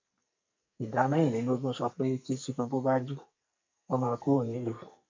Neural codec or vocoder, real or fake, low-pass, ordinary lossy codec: codec, 44.1 kHz, 2.6 kbps, SNAC; fake; 7.2 kHz; MP3, 48 kbps